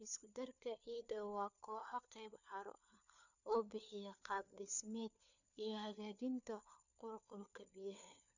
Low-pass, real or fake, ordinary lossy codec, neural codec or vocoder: 7.2 kHz; fake; none; codec, 16 kHz, 4 kbps, FunCodec, trained on LibriTTS, 50 frames a second